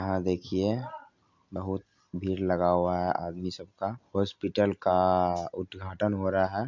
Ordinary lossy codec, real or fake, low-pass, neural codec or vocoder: MP3, 64 kbps; real; 7.2 kHz; none